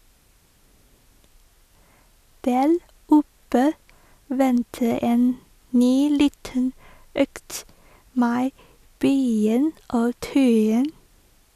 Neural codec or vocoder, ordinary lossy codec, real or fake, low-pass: none; none; real; 14.4 kHz